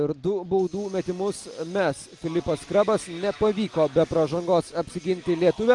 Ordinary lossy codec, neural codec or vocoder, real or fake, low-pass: Opus, 32 kbps; none; real; 10.8 kHz